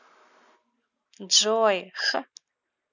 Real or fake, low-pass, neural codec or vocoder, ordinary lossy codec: real; 7.2 kHz; none; none